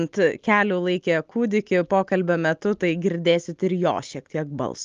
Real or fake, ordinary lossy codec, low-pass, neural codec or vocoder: real; Opus, 32 kbps; 7.2 kHz; none